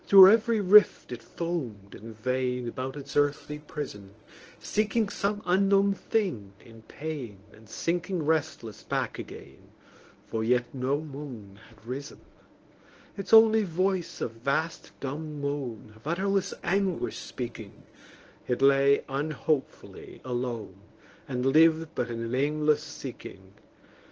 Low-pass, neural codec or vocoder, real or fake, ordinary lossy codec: 7.2 kHz; codec, 24 kHz, 0.9 kbps, WavTokenizer, medium speech release version 1; fake; Opus, 16 kbps